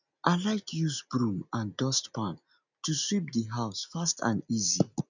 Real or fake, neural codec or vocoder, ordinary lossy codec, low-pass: real; none; none; 7.2 kHz